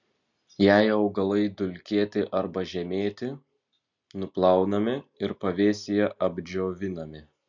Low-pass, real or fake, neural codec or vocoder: 7.2 kHz; real; none